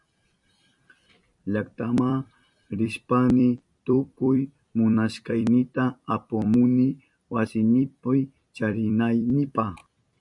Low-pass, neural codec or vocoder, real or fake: 10.8 kHz; vocoder, 44.1 kHz, 128 mel bands every 256 samples, BigVGAN v2; fake